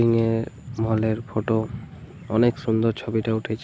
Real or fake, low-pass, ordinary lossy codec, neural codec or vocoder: real; none; none; none